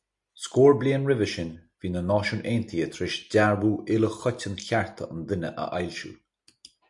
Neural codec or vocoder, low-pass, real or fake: none; 10.8 kHz; real